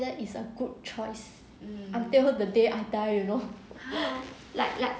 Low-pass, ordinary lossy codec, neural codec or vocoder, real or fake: none; none; none; real